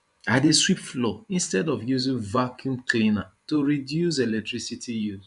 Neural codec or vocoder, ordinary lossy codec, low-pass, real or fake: vocoder, 24 kHz, 100 mel bands, Vocos; none; 10.8 kHz; fake